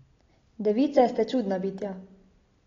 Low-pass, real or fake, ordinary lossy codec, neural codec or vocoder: 7.2 kHz; real; AAC, 32 kbps; none